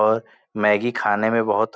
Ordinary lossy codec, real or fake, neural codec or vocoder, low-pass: none; real; none; none